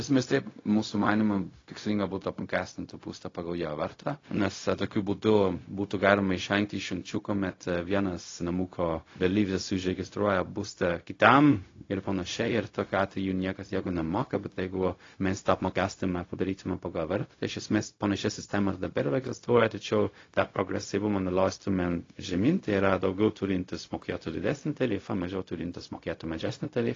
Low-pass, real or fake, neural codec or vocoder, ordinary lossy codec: 7.2 kHz; fake; codec, 16 kHz, 0.4 kbps, LongCat-Audio-Codec; AAC, 32 kbps